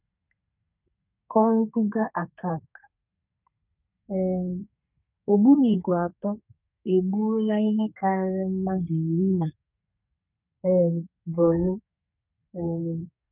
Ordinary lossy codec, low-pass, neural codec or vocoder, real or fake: none; 3.6 kHz; codec, 32 kHz, 1.9 kbps, SNAC; fake